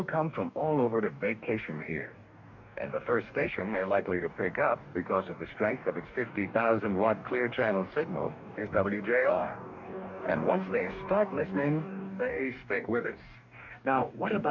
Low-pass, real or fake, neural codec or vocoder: 7.2 kHz; fake; codec, 44.1 kHz, 2.6 kbps, DAC